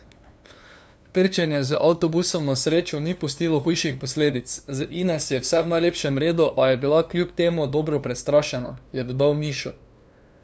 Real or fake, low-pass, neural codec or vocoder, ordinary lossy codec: fake; none; codec, 16 kHz, 2 kbps, FunCodec, trained on LibriTTS, 25 frames a second; none